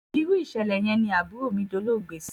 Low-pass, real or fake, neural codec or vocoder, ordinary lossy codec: 19.8 kHz; fake; vocoder, 44.1 kHz, 128 mel bands every 256 samples, BigVGAN v2; none